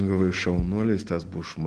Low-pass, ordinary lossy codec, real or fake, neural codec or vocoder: 9.9 kHz; Opus, 16 kbps; real; none